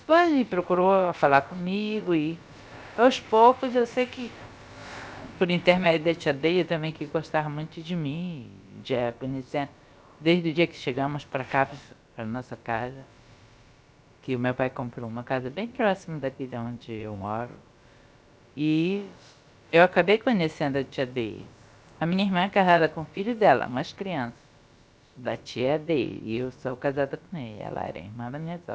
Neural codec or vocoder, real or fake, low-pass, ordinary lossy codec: codec, 16 kHz, about 1 kbps, DyCAST, with the encoder's durations; fake; none; none